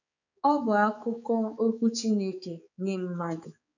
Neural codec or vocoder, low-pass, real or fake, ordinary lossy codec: codec, 16 kHz, 4 kbps, X-Codec, HuBERT features, trained on balanced general audio; 7.2 kHz; fake; none